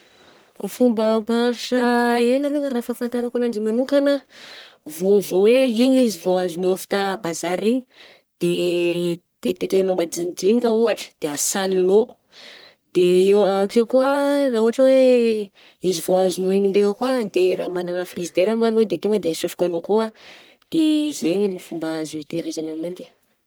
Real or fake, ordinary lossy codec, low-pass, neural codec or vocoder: fake; none; none; codec, 44.1 kHz, 1.7 kbps, Pupu-Codec